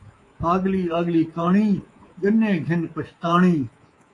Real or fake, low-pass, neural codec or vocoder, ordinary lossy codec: fake; 10.8 kHz; codec, 24 kHz, 3.1 kbps, DualCodec; AAC, 32 kbps